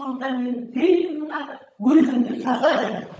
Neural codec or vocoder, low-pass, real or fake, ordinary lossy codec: codec, 16 kHz, 16 kbps, FunCodec, trained on LibriTTS, 50 frames a second; none; fake; none